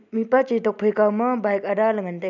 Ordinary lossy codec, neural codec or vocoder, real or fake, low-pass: none; none; real; 7.2 kHz